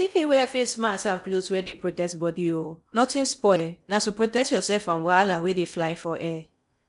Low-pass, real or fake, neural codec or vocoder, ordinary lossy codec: 10.8 kHz; fake; codec, 16 kHz in and 24 kHz out, 0.6 kbps, FocalCodec, streaming, 4096 codes; none